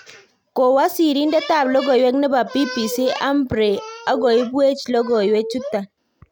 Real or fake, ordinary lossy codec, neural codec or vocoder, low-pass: real; none; none; 19.8 kHz